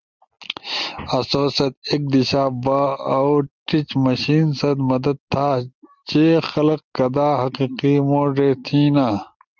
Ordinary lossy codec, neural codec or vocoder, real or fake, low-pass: Opus, 32 kbps; none; real; 7.2 kHz